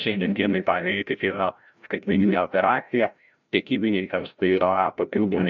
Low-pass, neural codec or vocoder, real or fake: 7.2 kHz; codec, 16 kHz, 0.5 kbps, FreqCodec, larger model; fake